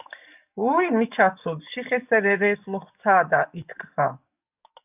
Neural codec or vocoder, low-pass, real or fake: codec, 44.1 kHz, 7.8 kbps, DAC; 3.6 kHz; fake